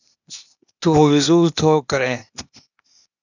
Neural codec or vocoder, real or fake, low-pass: codec, 16 kHz, 0.8 kbps, ZipCodec; fake; 7.2 kHz